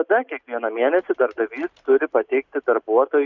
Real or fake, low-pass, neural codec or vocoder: real; 7.2 kHz; none